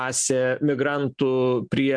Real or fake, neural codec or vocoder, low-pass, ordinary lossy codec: real; none; 9.9 kHz; MP3, 96 kbps